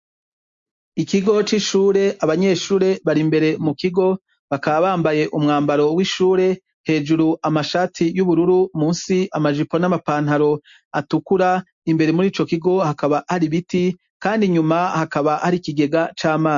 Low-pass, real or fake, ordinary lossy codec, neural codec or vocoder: 7.2 kHz; real; MP3, 48 kbps; none